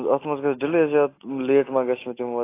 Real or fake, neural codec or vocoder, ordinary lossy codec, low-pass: real; none; AAC, 24 kbps; 3.6 kHz